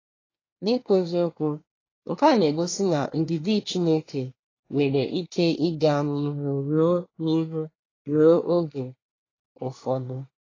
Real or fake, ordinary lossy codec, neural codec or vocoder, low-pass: fake; AAC, 32 kbps; codec, 24 kHz, 1 kbps, SNAC; 7.2 kHz